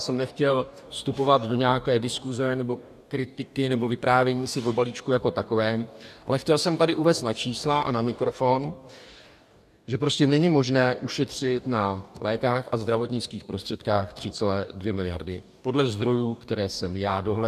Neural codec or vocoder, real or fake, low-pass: codec, 44.1 kHz, 2.6 kbps, DAC; fake; 14.4 kHz